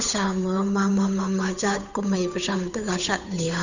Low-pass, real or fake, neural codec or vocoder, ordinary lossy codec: 7.2 kHz; fake; codec, 16 kHz, 8 kbps, FunCodec, trained on Chinese and English, 25 frames a second; none